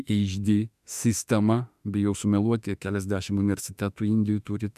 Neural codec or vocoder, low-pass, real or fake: autoencoder, 48 kHz, 32 numbers a frame, DAC-VAE, trained on Japanese speech; 14.4 kHz; fake